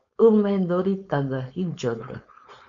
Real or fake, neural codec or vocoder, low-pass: fake; codec, 16 kHz, 4.8 kbps, FACodec; 7.2 kHz